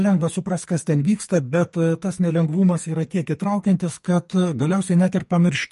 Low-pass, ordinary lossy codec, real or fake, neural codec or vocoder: 14.4 kHz; MP3, 48 kbps; fake; codec, 44.1 kHz, 2.6 kbps, SNAC